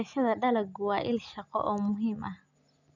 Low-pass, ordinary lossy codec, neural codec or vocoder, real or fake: 7.2 kHz; none; none; real